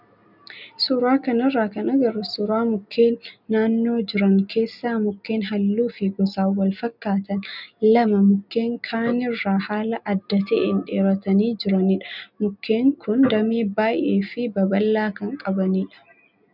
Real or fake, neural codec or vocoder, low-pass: real; none; 5.4 kHz